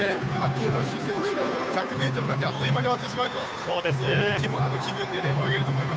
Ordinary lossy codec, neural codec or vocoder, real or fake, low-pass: none; codec, 16 kHz, 2 kbps, FunCodec, trained on Chinese and English, 25 frames a second; fake; none